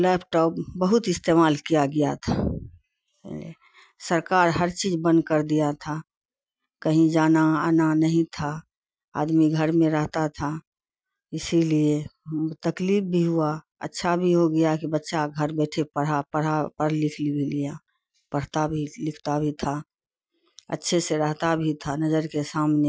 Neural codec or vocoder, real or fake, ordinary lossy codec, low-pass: none; real; none; none